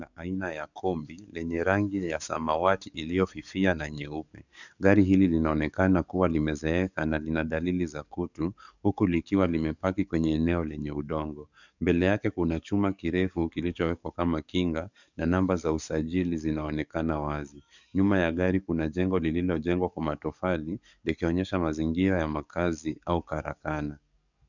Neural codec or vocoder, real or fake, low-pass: codec, 44.1 kHz, 7.8 kbps, DAC; fake; 7.2 kHz